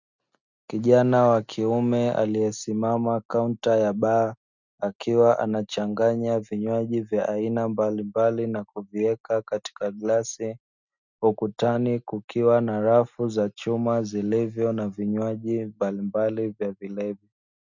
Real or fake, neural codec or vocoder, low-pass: real; none; 7.2 kHz